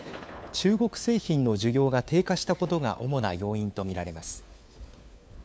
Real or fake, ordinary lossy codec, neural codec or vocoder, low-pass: fake; none; codec, 16 kHz, 4 kbps, FunCodec, trained on LibriTTS, 50 frames a second; none